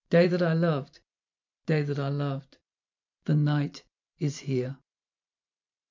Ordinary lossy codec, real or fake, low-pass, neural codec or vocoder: MP3, 64 kbps; fake; 7.2 kHz; vocoder, 44.1 kHz, 128 mel bands every 512 samples, BigVGAN v2